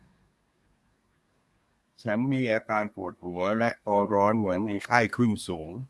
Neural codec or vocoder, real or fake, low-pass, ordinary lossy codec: codec, 24 kHz, 1 kbps, SNAC; fake; none; none